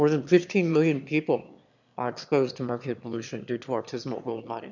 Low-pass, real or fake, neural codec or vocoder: 7.2 kHz; fake; autoencoder, 22.05 kHz, a latent of 192 numbers a frame, VITS, trained on one speaker